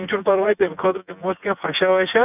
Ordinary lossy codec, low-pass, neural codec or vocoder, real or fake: none; 3.6 kHz; vocoder, 24 kHz, 100 mel bands, Vocos; fake